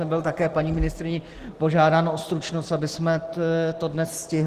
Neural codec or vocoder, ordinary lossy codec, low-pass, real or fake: none; Opus, 16 kbps; 14.4 kHz; real